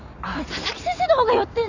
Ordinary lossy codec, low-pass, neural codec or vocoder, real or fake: none; 7.2 kHz; none; real